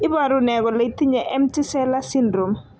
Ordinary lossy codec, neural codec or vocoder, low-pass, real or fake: none; none; none; real